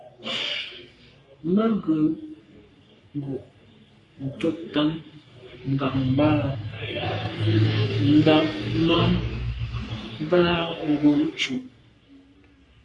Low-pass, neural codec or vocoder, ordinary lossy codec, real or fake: 10.8 kHz; codec, 44.1 kHz, 3.4 kbps, Pupu-Codec; Opus, 64 kbps; fake